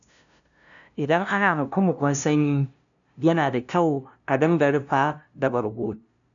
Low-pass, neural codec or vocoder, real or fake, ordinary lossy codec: 7.2 kHz; codec, 16 kHz, 0.5 kbps, FunCodec, trained on LibriTTS, 25 frames a second; fake; none